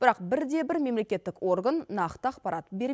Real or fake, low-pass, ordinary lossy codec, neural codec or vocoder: real; none; none; none